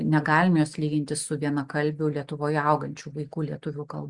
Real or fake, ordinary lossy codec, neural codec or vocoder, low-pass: real; Opus, 64 kbps; none; 10.8 kHz